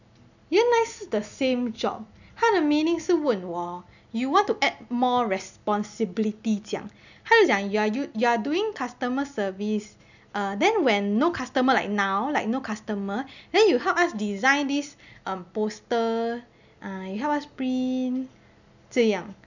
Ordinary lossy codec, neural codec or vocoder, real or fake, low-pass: none; none; real; 7.2 kHz